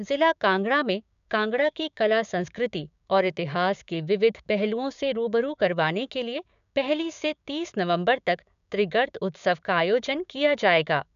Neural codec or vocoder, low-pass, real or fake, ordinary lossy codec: codec, 16 kHz, 6 kbps, DAC; 7.2 kHz; fake; none